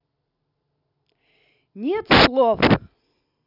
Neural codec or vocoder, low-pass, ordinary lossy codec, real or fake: none; 5.4 kHz; none; real